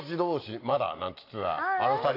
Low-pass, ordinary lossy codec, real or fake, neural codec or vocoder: 5.4 kHz; AAC, 32 kbps; real; none